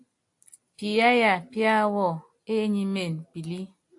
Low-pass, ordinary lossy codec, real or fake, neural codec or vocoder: 10.8 kHz; AAC, 64 kbps; real; none